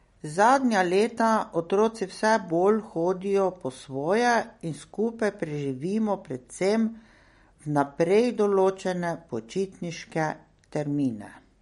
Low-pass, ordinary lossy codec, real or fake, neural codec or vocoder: 19.8 kHz; MP3, 48 kbps; real; none